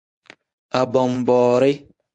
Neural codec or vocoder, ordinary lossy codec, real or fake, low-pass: codec, 24 kHz, 0.9 kbps, WavTokenizer, medium speech release version 1; AAC, 64 kbps; fake; 10.8 kHz